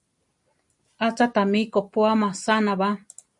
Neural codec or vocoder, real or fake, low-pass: none; real; 10.8 kHz